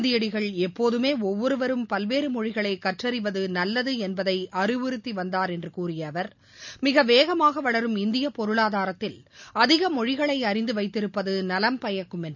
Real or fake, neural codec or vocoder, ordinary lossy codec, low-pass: real; none; none; 7.2 kHz